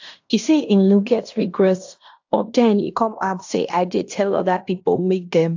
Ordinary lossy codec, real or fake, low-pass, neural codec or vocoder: AAC, 48 kbps; fake; 7.2 kHz; codec, 16 kHz in and 24 kHz out, 0.9 kbps, LongCat-Audio-Codec, fine tuned four codebook decoder